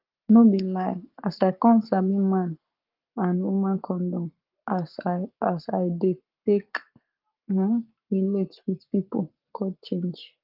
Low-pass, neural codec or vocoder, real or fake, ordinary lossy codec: 5.4 kHz; codec, 44.1 kHz, 7.8 kbps, Pupu-Codec; fake; Opus, 24 kbps